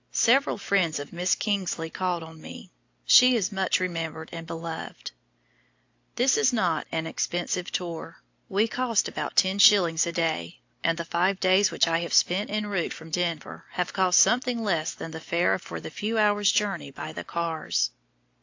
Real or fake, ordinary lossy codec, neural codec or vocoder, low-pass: real; AAC, 48 kbps; none; 7.2 kHz